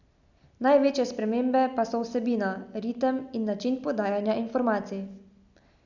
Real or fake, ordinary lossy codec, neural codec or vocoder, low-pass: real; none; none; 7.2 kHz